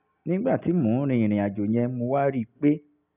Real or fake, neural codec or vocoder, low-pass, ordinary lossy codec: real; none; 3.6 kHz; none